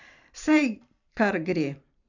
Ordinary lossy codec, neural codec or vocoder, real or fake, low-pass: none; vocoder, 44.1 kHz, 128 mel bands every 512 samples, BigVGAN v2; fake; 7.2 kHz